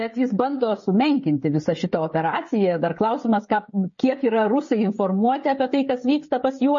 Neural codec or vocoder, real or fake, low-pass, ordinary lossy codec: codec, 16 kHz, 16 kbps, FreqCodec, smaller model; fake; 7.2 kHz; MP3, 32 kbps